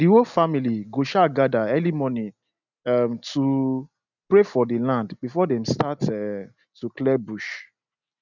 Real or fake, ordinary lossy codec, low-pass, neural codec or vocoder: real; none; 7.2 kHz; none